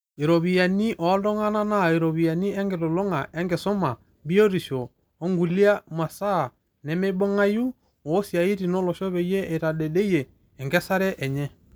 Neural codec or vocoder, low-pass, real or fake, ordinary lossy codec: none; none; real; none